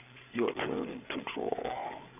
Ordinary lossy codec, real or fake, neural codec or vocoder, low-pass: none; fake; codec, 16 kHz, 8 kbps, FreqCodec, larger model; 3.6 kHz